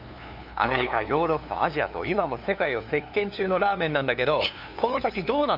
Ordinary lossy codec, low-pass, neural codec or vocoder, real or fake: none; 5.4 kHz; codec, 16 kHz, 2 kbps, FunCodec, trained on LibriTTS, 25 frames a second; fake